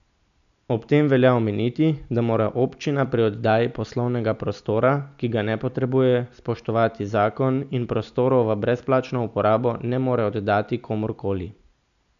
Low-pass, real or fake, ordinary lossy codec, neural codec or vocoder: 7.2 kHz; real; none; none